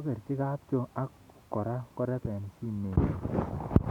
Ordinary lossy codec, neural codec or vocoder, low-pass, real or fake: none; none; 19.8 kHz; real